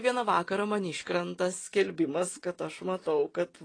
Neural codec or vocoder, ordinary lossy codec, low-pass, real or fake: none; AAC, 32 kbps; 9.9 kHz; real